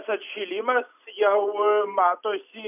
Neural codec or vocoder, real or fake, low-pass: none; real; 3.6 kHz